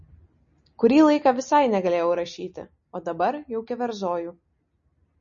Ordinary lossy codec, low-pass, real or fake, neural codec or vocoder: MP3, 32 kbps; 7.2 kHz; real; none